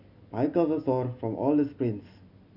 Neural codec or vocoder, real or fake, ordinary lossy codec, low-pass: none; real; none; 5.4 kHz